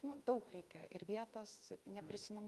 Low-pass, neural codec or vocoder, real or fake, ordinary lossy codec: 14.4 kHz; autoencoder, 48 kHz, 32 numbers a frame, DAC-VAE, trained on Japanese speech; fake; Opus, 32 kbps